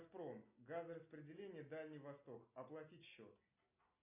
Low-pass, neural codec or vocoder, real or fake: 3.6 kHz; none; real